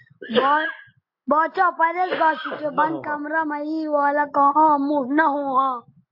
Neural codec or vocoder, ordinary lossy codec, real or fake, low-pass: none; MP3, 32 kbps; real; 5.4 kHz